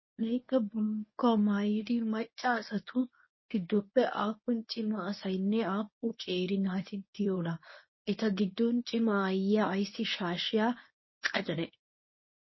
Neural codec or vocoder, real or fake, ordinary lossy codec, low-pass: codec, 24 kHz, 0.9 kbps, WavTokenizer, medium speech release version 1; fake; MP3, 24 kbps; 7.2 kHz